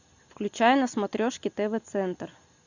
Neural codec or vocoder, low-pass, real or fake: none; 7.2 kHz; real